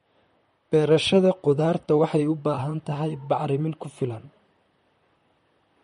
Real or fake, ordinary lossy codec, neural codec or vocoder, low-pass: fake; MP3, 48 kbps; vocoder, 44.1 kHz, 128 mel bands, Pupu-Vocoder; 19.8 kHz